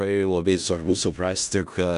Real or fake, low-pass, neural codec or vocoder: fake; 10.8 kHz; codec, 16 kHz in and 24 kHz out, 0.4 kbps, LongCat-Audio-Codec, four codebook decoder